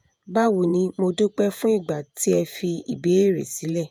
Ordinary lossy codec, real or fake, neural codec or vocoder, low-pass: none; fake; vocoder, 48 kHz, 128 mel bands, Vocos; none